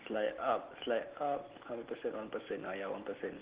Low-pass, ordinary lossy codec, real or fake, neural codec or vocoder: 3.6 kHz; Opus, 16 kbps; real; none